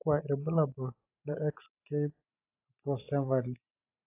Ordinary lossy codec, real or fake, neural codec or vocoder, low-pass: AAC, 24 kbps; fake; vocoder, 44.1 kHz, 128 mel bands every 512 samples, BigVGAN v2; 3.6 kHz